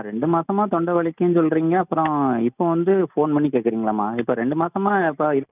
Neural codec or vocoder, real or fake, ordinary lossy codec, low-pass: none; real; none; 3.6 kHz